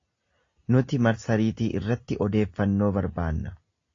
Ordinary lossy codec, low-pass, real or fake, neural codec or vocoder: AAC, 32 kbps; 7.2 kHz; real; none